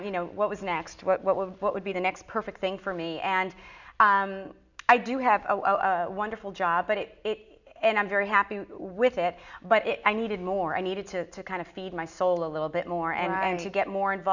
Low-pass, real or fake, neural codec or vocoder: 7.2 kHz; real; none